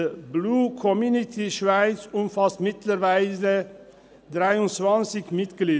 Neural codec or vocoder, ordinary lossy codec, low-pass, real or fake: none; none; none; real